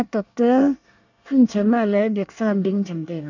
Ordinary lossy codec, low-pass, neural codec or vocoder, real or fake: none; 7.2 kHz; codec, 24 kHz, 1 kbps, SNAC; fake